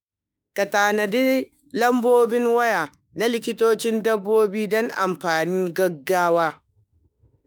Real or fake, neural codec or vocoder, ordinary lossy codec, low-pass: fake; autoencoder, 48 kHz, 32 numbers a frame, DAC-VAE, trained on Japanese speech; none; none